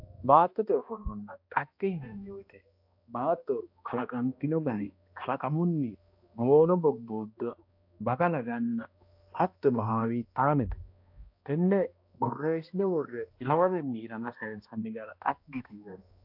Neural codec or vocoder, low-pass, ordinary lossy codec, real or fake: codec, 16 kHz, 1 kbps, X-Codec, HuBERT features, trained on balanced general audio; 5.4 kHz; AAC, 48 kbps; fake